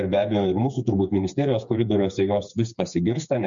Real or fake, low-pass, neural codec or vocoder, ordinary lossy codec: fake; 7.2 kHz; codec, 16 kHz, 4 kbps, FreqCodec, smaller model; MP3, 64 kbps